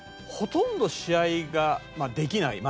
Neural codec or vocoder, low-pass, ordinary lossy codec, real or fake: none; none; none; real